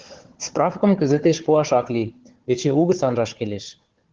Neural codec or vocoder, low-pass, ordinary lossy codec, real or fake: codec, 16 kHz, 4 kbps, FunCodec, trained on Chinese and English, 50 frames a second; 7.2 kHz; Opus, 16 kbps; fake